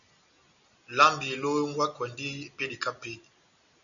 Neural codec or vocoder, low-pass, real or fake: none; 7.2 kHz; real